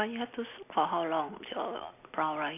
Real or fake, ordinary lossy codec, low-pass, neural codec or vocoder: real; none; 3.6 kHz; none